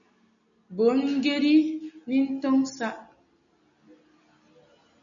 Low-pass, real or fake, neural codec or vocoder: 7.2 kHz; real; none